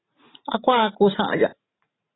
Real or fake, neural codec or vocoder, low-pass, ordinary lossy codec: fake; vocoder, 44.1 kHz, 128 mel bands every 512 samples, BigVGAN v2; 7.2 kHz; AAC, 16 kbps